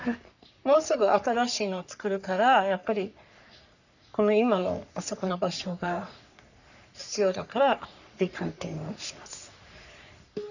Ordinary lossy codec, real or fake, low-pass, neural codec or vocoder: none; fake; 7.2 kHz; codec, 44.1 kHz, 3.4 kbps, Pupu-Codec